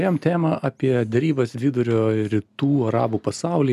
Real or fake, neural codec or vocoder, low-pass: real; none; 14.4 kHz